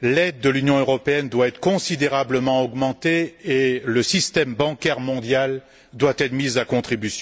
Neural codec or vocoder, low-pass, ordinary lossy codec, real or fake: none; none; none; real